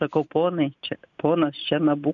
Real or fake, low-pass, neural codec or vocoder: real; 7.2 kHz; none